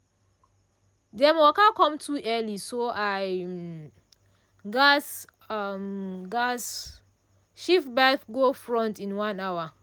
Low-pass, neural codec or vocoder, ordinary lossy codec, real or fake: none; none; none; real